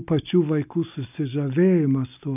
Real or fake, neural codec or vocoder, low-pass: real; none; 3.6 kHz